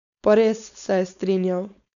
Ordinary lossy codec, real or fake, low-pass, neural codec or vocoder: none; fake; 7.2 kHz; codec, 16 kHz, 4.8 kbps, FACodec